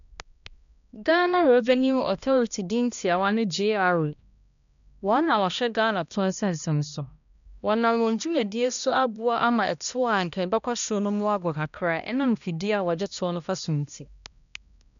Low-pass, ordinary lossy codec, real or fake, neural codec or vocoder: 7.2 kHz; none; fake; codec, 16 kHz, 1 kbps, X-Codec, HuBERT features, trained on balanced general audio